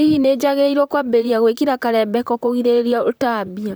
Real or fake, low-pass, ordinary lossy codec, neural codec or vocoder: fake; none; none; vocoder, 44.1 kHz, 128 mel bands, Pupu-Vocoder